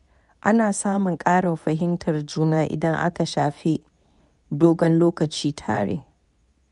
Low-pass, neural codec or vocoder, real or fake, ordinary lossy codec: 10.8 kHz; codec, 24 kHz, 0.9 kbps, WavTokenizer, medium speech release version 2; fake; none